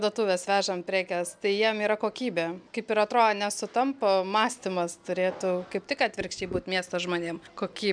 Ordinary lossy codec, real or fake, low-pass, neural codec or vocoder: MP3, 96 kbps; real; 9.9 kHz; none